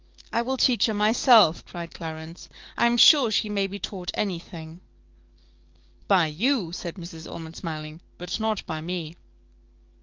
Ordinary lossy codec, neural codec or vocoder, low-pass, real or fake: Opus, 24 kbps; codec, 44.1 kHz, 7.8 kbps, DAC; 7.2 kHz; fake